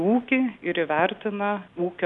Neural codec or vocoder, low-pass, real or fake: none; 10.8 kHz; real